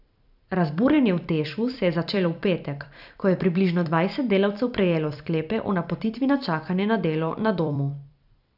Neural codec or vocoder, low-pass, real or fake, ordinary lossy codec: vocoder, 44.1 kHz, 128 mel bands every 256 samples, BigVGAN v2; 5.4 kHz; fake; none